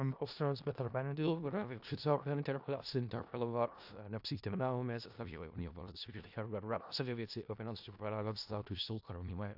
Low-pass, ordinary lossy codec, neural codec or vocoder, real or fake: 5.4 kHz; AAC, 48 kbps; codec, 16 kHz in and 24 kHz out, 0.4 kbps, LongCat-Audio-Codec, four codebook decoder; fake